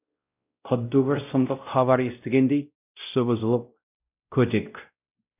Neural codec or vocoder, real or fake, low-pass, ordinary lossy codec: codec, 16 kHz, 0.5 kbps, X-Codec, WavLM features, trained on Multilingual LibriSpeech; fake; 3.6 kHz; none